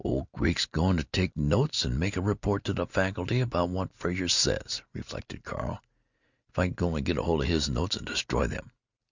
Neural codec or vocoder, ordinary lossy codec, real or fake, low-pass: none; Opus, 64 kbps; real; 7.2 kHz